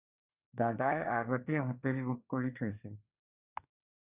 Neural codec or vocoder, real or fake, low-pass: codec, 24 kHz, 1 kbps, SNAC; fake; 3.6 kHz